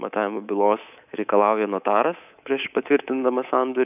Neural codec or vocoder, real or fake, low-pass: codec, 24 kHz, 3.1 kbps, DualCodec; fake; 3.6 kHz